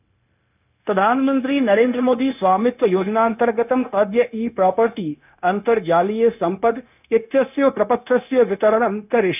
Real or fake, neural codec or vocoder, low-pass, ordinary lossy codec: fake; codec, 16 kHz, 0.9 kbps, LongCat-Audio-Codec; 3.6 kHz; none